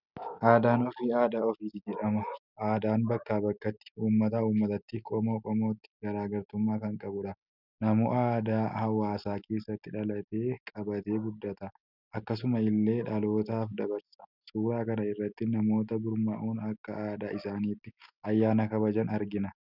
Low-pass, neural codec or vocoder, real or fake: 5.4 kHz; none; real